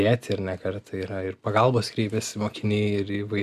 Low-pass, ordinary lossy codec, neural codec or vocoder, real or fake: 14.4 kHz; Opus, 64 kbps; none; real